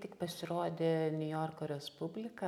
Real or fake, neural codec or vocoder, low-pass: real; none; 19.8 kHz